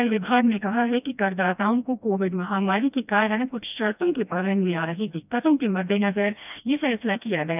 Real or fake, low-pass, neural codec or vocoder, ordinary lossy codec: fake; 3.6 kHz; codec, 16 kHz, 1 kbps, FreqCodec, smaller model; none